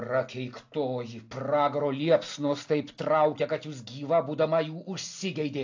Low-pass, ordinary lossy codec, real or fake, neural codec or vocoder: 7.2 kHz; AAC, 48 kbps; real; none